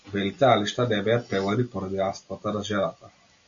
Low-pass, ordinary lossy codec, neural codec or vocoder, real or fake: 7.2 kHz; AAC, 64 kbps; none; real